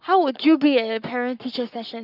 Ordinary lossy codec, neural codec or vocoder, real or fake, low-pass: none; codec, 44.1 kHz, 7.8 kbps, Pupu-Codec; fake; 5.4 kHz